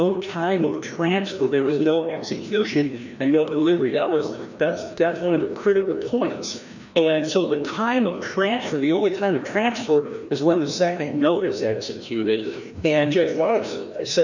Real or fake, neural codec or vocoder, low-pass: fake; codec, 16 kHz, 1 kbps, FreqCodec, larger model; 7.2 kHz